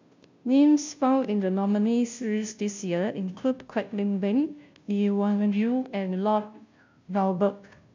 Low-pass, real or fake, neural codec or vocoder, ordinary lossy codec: 7.2 kHz; fake; codec, 16 kHz, 0.5 kbps, FunCodec, trained on Chinese and English, 25 frames a second; MP3, 64 kbps